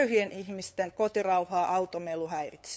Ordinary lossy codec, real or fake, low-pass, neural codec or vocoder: none; fake; none; codec, 16 kHz, 8 kbps, FunCodec, trained on LibriTTS, 25 frames a second